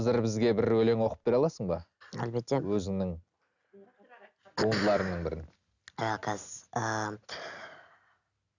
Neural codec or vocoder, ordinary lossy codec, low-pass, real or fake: none; none; 7.2 kHz; real